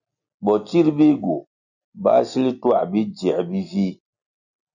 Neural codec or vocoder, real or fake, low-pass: none; real; 7.2 kHz